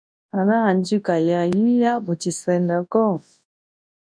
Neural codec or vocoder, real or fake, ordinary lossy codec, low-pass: codec, 24 kHz, 0.9 kbps, WavTokenizer, large speech release; fake; MP3, 96 kbps; 9.9 kHz